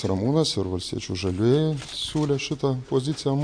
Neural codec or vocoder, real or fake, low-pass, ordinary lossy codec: none; real; 9.9 kHz; AAC, 64 kbps